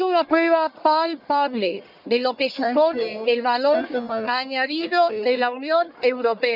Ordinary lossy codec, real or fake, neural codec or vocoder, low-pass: none; fake; codec, 44.1 kHz, 1.7 kbps, Pupu-Codec; 5.4 kHz